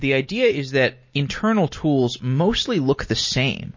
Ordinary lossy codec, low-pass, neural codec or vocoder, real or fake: MP3, 32 kbps; 7.2 kHz; none; real